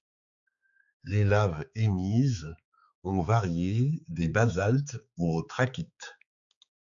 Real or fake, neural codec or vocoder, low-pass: fake; codec, 16 kHz, 4 kbps, X-Codec, HuBERT features, trained on balanced general audio; 7.2 kHz